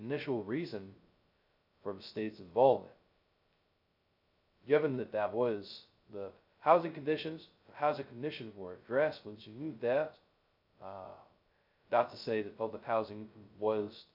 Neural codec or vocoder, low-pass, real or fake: codec, 16 kHz, 0.2 kbps, FocalCodec; 5.4 kHz; fake